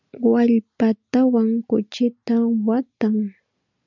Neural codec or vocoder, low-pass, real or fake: none; 7.2 kHz; real